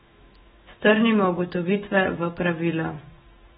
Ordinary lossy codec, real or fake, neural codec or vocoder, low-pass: AAC, 16 kbps; fake; autoencoder, 48 kHz, 128 numbers a frame, DAC-VAE, trained on Japanese speech; 19.8 kHz